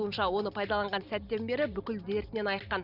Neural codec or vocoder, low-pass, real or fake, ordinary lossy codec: none; 5.4 kHz; real; none